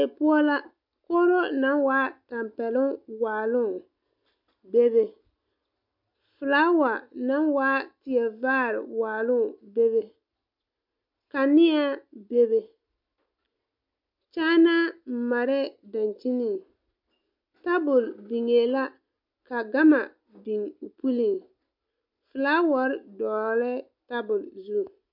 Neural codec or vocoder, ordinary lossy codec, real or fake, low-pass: none; MP3, 48 kbps; real; 5.4 kHz